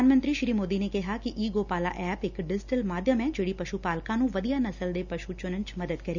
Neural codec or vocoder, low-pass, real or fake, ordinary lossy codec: none; 7.2 kHz; real; none